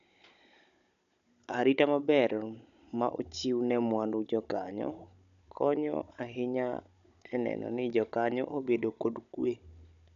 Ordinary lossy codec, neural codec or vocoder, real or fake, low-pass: MP3, 96 kbps; codec, 16 kHz, 16 kbps, FunCodec, trained on Chinese and English, 50 frames a second; fake; 7.2 kHz